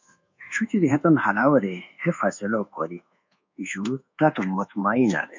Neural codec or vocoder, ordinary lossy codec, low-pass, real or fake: codec, 24 kHz, 1.2 kbps, DualCodec; MP3, 48 kbps; 7.2 kHz; fake